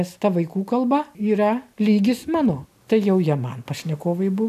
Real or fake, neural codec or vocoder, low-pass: real; none; 14.4 kHz